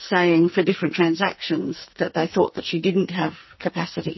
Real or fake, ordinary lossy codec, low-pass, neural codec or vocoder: fake; MP3, 24 kbps; 7.2 kHz; codec, 44.1 kHz, 2.6 kbps, SNAC